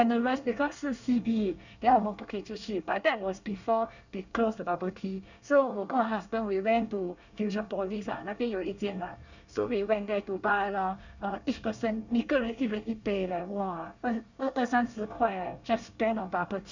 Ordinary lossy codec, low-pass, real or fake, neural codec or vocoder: none; 7.2 kHz; fake; codec, 24 kHz, 1 kbps, SNAC